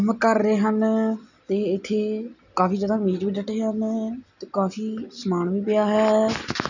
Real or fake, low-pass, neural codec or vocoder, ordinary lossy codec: real; 7.2 kHz; none; none